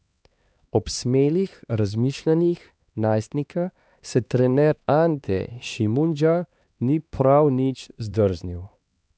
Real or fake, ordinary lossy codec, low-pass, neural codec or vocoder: fake; none; none; codec, 16 kHz, 2 kbps, X-Codec, HuBERT features, trained on LibriSpeech